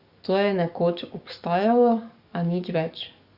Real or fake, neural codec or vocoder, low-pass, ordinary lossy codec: fake; codec, 44.1 kHz, 7.8 kbps, DAC; 5.4 kHz; Opus, 64 kbps